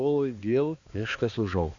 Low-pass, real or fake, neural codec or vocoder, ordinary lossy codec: 7.2 kHz; fake; codec, 16 kHz, 2 kbps, X-Codec, HuBERT features, trained on balanced general audio; Opus, 64 kbps